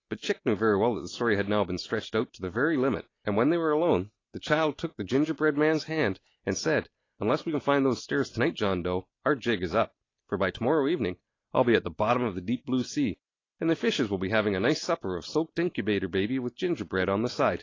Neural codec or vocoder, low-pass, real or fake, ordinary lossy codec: none; 7.2 kHz; real; AAC, 32 kbps